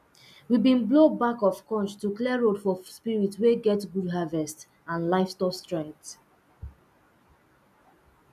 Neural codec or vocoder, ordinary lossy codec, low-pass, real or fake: none; none; 14.4 kHz; real